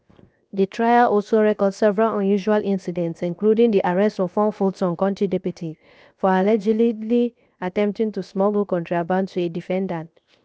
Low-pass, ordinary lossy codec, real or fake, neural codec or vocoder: none; none; fake; codec, 16 kHz, 0.7 kbps, FocalCodec